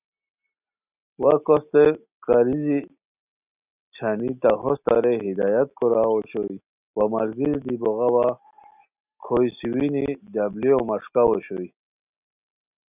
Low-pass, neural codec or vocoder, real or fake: 3.6 kHz; none; real